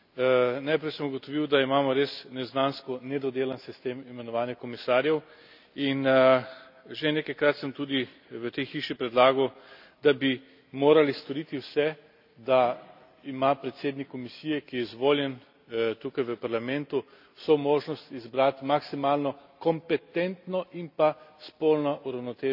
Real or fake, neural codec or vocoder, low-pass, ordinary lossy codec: real; none; 5.4 kHz; none